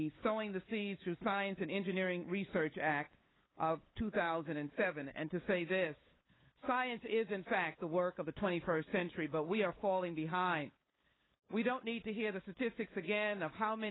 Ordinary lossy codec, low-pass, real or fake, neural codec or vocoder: AAC, 16 kbps; 7.2 kHz; fake; codec, 16 kHz, 8 kbps, FunCodec, trained on LibriTTS, 25 frames a second